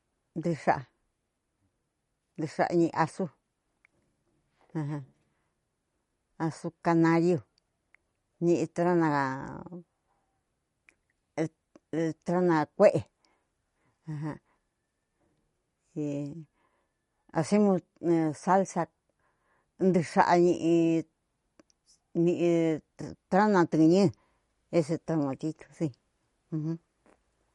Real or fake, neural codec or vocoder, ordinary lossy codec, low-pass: real; none; MP3, 48 kbps; 19.8 kHz